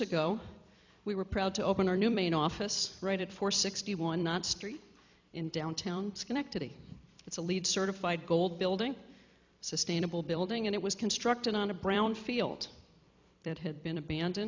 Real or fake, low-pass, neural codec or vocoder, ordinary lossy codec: fake; 7.2 kHz; vocoder, 44.1 kHz, 128 mel bands every 256 samples, BigVGAN v2; MP3, 64 kbps